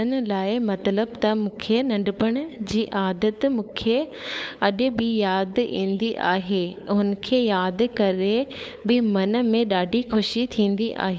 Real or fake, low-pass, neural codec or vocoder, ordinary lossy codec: fake; none; codec, 16 kHz, 8 kbps, FunCodec, trained on LibriTTS, 25 frames a second; none